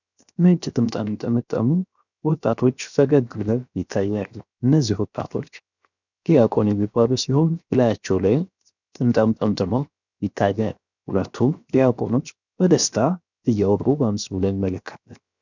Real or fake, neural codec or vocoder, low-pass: fake; codec, 16 kHz, 0.7 kbps, FocalCodec; 7.2 kHz